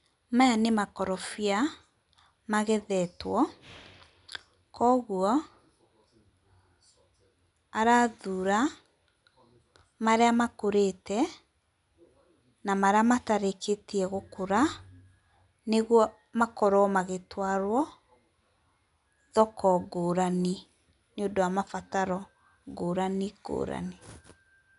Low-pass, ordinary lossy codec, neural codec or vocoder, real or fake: 10.8 kHz; none; none; real